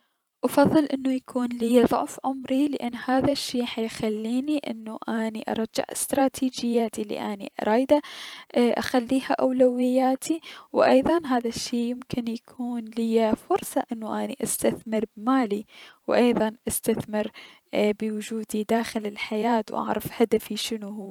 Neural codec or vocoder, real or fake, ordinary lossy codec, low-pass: vocoder, 44.1 kHz, 128 mel bands every 512 samples, BigVGAN v2; fake; none; 19.8 kHz